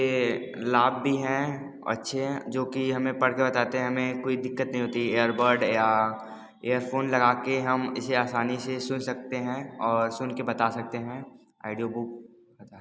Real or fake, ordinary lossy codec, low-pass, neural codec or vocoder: real; none; none; none